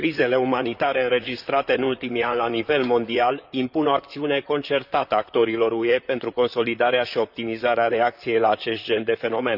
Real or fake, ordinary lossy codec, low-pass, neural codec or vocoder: fake; none; 5.4 kHz; vocoder, 44.1 kHz, 128 mel bands, Pupu-Vocoder